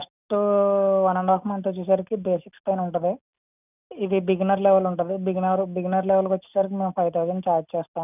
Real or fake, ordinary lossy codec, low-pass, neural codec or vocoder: real; none; 3.6 kHz; none